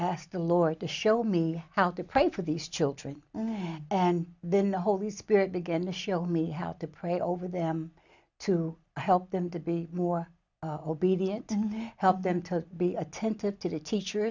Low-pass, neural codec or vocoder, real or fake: 7.2 kHz; none; real